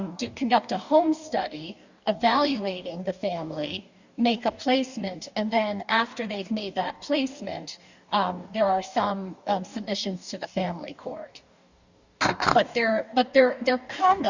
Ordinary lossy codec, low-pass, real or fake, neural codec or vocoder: Opus, 64 kbps; 7.2 kHz; fake; codec, 44.1 kHz, 2.6 kbps, DAC